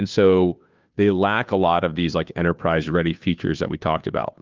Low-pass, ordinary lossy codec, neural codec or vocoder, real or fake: 7.2 kHz; Opus, 24 kbps; codec, 16 kHz, 2 kbps, FunCodec, trained on Chinese and English, 25 frames a second; fake